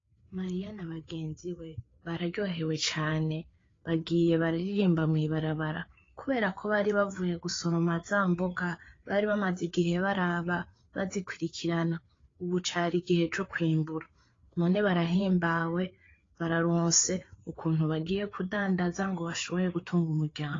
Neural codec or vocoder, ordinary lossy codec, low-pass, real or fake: codec, 16 kHz, 4 kbps, FreqCodec, larger model; AAC, 32 kbps; 7.2 kHz; fake